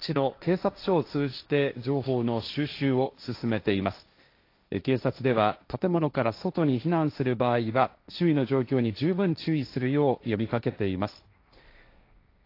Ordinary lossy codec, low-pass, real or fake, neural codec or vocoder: AAC, 32 kbps; 5.4 kHz; fake; codec, 16 kHz, 1.1 kbps, Voila-Tokenizer